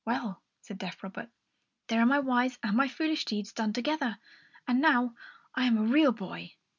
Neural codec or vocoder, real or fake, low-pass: none; real; 7.2 kHz